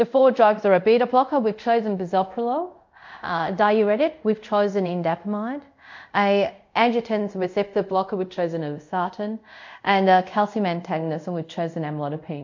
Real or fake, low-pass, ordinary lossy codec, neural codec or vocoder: fake; 7.2 kHz; MP3, 48 kbps; codec, 24 kHz, 0.5 kbps, DualCodec